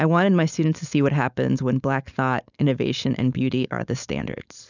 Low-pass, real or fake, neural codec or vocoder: 7.2 kHz; fake; codec, 16 kHz, 4.8 kbps, FACodec